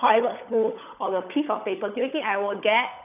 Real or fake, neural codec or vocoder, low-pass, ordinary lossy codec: fake; codec, 16 kHz, 16 kbps, FunCodec, trained on LibriTTS, 50 frames a second; 3.6 kHz; none